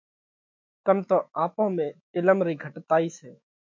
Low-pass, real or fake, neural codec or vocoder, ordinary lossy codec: 7.2 kHz; fake; autoencoder, 48 kHz, 128 numbers a frame, DAC-VAE, trained on Japanese speech; MP3, 48 kbps